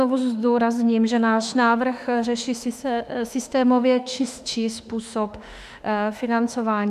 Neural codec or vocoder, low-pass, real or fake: autoencoder, 48 kHz, 32 numbers a frame, DAC-VAE, trained on Japanese speech; 14.4 kHz; fake